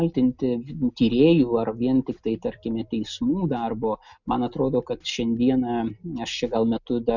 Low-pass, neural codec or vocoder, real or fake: 7.2 kHz; none; real